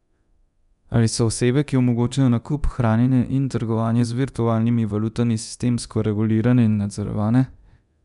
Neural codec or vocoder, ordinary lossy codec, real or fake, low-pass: codec, 24 kHz, 0.9 kbps, DualCodec; none; fake; 10.8 kHz